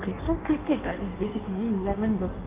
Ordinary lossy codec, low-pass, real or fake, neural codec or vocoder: Opus, 24 kbps; 3.6 kHz; fake; codec, 16 kHz in and 24 kHz out, 1.1 kbps, FireRedTTS-2 codec